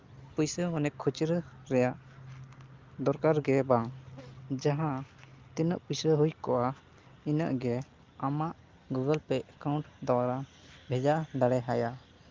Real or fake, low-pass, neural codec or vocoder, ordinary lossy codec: real; 7.2 kHz; none; Opus, 32 kbps